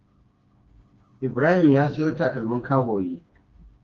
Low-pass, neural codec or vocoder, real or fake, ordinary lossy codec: 7.2 kHz; codec, 16 kHz, 2 kbps, FreqCodec, smaller model; fake; Opus, 32 kbps